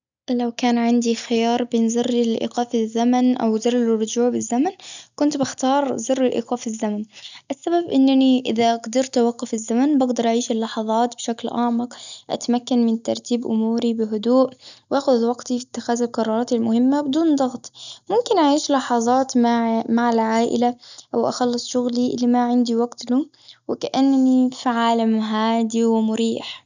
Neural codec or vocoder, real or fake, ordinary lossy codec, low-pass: none; real; none; 7.2 kHz